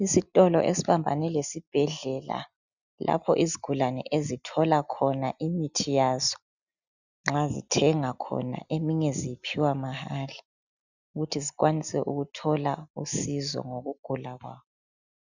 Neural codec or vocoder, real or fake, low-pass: none; real; 7.2 kHz